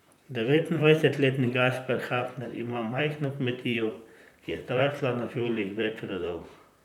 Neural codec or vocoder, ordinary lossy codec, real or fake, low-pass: vocoder, 44.1 kHz, 128 mel bands, Pupu-Vocoder; none; fake; 19.8 kHz